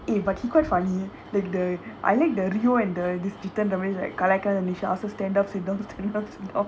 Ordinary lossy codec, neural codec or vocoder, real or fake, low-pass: none; none; real; none